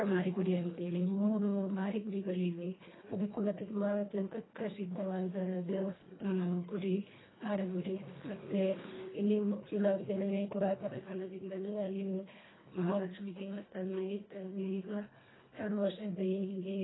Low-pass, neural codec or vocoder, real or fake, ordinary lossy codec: 7.2 kHz; codec, 24 kHz, 1.5 kbps, HILCodec; fake; AAC, 16 kbps